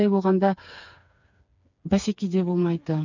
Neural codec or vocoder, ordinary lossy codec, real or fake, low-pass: codec, 16 kHz, 4 kbps, FreqCodec, smaller model; none; fake; 7.2 kHz